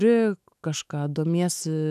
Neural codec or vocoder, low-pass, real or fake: autoencoder, 48 kHz, 128 numbers a frame, DAC-VAE, trained on Japanese speech; 14.4 kHz; fake